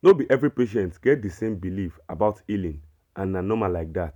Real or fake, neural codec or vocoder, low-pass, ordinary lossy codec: real; none; 19.8 kHz; none